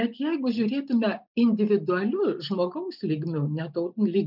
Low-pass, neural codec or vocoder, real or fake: 5.4 kHz; none; real